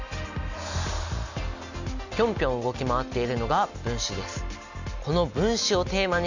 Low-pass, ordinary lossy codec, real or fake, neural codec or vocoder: 7.2 kHz; none; real; none